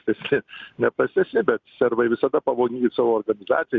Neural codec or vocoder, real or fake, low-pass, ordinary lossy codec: none; real; 7.2 kHz; Opus, 64 kbps